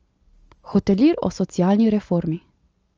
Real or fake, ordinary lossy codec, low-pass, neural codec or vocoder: real; Opus, 24 kbps; 7.2 kHz; none